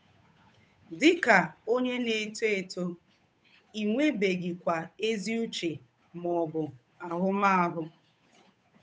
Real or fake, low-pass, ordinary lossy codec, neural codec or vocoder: fake; none; none; codec, 16 kHz, 8 kbps, FunCodec, trained on Chinese and English, 25 frames a second